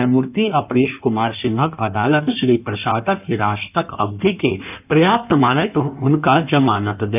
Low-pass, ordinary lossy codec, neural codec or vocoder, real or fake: 3.6 kHz; none; codec, 16 kHz in and 24 kHz out, 1.1 kbps, FireRedTTS-2 codec; fake